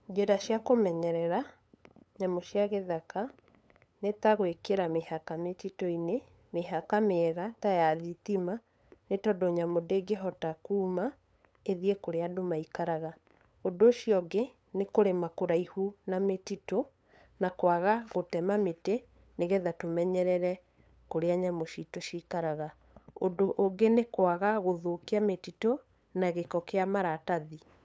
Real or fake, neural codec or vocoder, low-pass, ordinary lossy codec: fake; codec, 16 kHz, 8 kbps, FunCodec, trained on LibriTTS, 25 frames a second; none; none